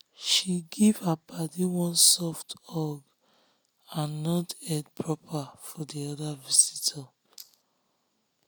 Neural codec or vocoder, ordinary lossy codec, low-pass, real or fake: none; none; none; real